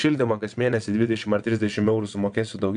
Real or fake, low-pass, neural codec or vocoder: fake; 9.9 kHz; vocoder, 22.05 kHz, 80 mel bands, WaveNeXt